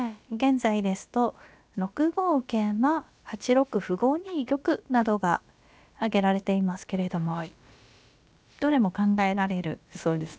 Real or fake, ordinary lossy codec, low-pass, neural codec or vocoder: fake; none; none; codec, 16 kHz, about 1 kbps, DyCAST, with the encoder's durations